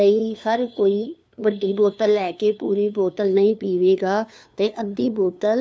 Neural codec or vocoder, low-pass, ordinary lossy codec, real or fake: codec, 16 kHz, 2 kbps, FunCodec, trained on LibriTTS, 25 frames a second; none; none; fake